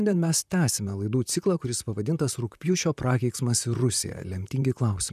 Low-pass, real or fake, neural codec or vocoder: 14.4 kHz; fake; vocoder, 44.1 kHz, 128 mel bands, Pupu-Vocoder